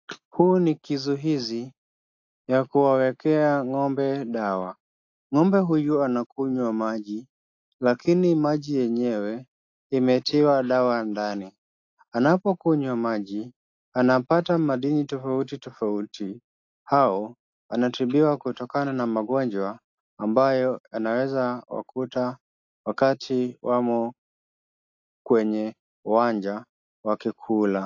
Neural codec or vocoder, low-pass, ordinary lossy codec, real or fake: none; 7.2 kHz; AAC, 48 kbps; real